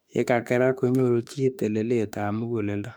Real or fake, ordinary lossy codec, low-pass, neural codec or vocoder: fake; none; 19.8 kHz; autoencoder, 48 kHz, 32 numbers a frame, DAC-VAE, trained on Japanese speech